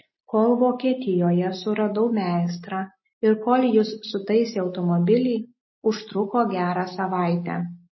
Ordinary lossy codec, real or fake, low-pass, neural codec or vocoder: MP3, 24 kbps; real; 7.2 kHz; none